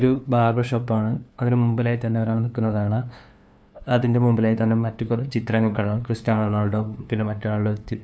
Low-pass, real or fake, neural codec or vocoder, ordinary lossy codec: none; fake; codec, 16 kHz, 2 kbps, FunCodec, trained on LibriTTS, 25 frames a second; none